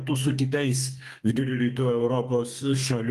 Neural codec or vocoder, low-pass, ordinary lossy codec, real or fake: codec, 32 kHz, 1.9 kbps, SNAC; 14.4 kHz; Opus, 32 kbps; fake